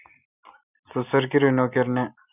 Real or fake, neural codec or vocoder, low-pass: real; none; 3.6 kHz